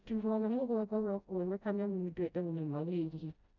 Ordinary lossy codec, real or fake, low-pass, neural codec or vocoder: none; fake; 7.2 kHz; codec, 16 kHz, 0.5 kbps, FreqCodec, smaller model